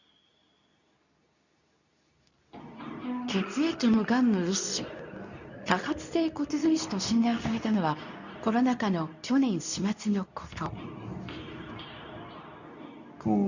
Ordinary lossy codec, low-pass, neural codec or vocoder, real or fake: none; 7.2 kHz; codec, 24 kHz, 0.9 kbps, WavTokenizer, medium speech release version 2; fake